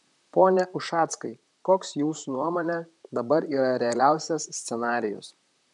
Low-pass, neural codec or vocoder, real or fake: 10.8 kHz; vocoder, 44.1 kHz, 128 mel bands, Pupu-Vocoder; fake